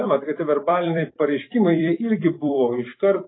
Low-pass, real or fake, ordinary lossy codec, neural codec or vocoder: 7.2 kHz; fake; AAC, 16 kbps; vocoder, 44.1 kHz, 128 mel bands every 256 samples, BigVGAN v2